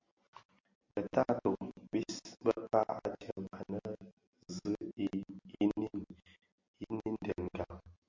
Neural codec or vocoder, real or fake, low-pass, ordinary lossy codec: none; real; 7.2 kHz; MP3, 48 kbps